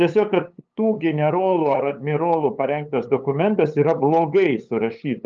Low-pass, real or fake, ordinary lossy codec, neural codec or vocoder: 7.2 kHz; fake; Opus, 24 kbps; codec, 16 kHz, 8 kbps, FunCodec, trained on LibriTTS, 25 frames a second